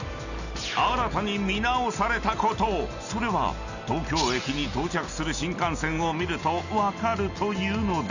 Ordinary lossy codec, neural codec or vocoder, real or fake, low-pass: none; none; real; 7.2 kHz